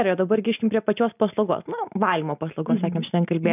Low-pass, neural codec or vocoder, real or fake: 3.6 kHz; none; real